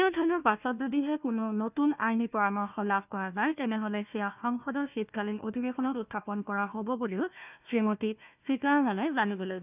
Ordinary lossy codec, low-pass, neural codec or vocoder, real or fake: none; 3.6 kHz; codec, 16 kHz, 1 kbps, FunCodec, trained on Chinese and English, 50 frames a second; fake